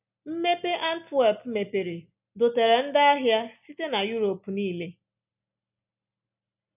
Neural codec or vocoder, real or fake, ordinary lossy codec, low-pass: none; real; none; 3.6 kHz